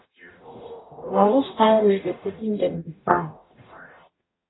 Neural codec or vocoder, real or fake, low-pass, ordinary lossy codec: codec, 44.1 kHz, 0.9 kbps, DAC; fake; 7.2 kHz; AAC, 16 kbps